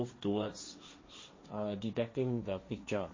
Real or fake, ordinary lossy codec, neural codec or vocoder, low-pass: fake; MP3, 32 kbps; codec, 16 kHz, 1.1 kbps, Voila-Tokenizer; 7.2 kHz